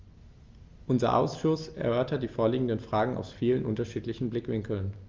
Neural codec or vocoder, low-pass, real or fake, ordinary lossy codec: none; 7.2 kHz; real; Opus, 32 kbps